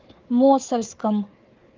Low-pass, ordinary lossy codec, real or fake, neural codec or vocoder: 7.2 kHz; Opus, 24 kbps; fake; codec, 16 kHz, 4 kbps, FunCodec, trained on Chinese and English, 50 frames a second